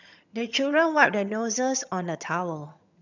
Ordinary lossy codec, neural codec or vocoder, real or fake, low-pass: none; vocoder, 22.05 kHz, 80 mel bands, HiFi-GAN; fake; 7.2 kHz